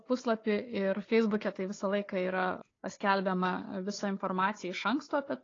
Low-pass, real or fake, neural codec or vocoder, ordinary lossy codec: 7.2 kHz; fake; codec, 16 kHz, 4 kbps, FunCodec, trained on Chinese and English, 50 frames a second; AAC, 32 kbps